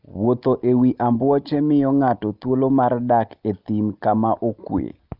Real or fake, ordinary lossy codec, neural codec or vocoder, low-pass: real; Opus, 32 kbps; none; 5.4 kHz